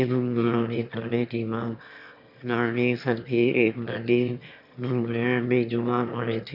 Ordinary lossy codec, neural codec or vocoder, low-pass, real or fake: none; autoencoder, 22.05 kHz, a latent of 192 numbers a frame, VITS, trained on one speaker; 5.4 kHz; fake